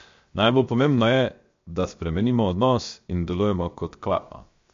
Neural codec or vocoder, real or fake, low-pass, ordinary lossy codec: codec, 16 kHz, 0.7 kbps, FocalCodec; fake; 7.2 kHz; MP3, 48 kbps